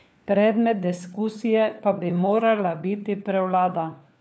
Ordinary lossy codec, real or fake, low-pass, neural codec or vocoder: none; fake; none; codec, 16 kHz, 4 kbps, FunCodec, trained on LibriTTS, 50 frames a second